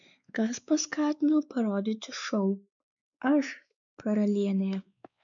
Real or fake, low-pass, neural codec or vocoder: fake; 7.2 kHz; codec, 16 kHz, 4 kbps, X-Codec, WavLM features, trained on Multilingual LibriSpeech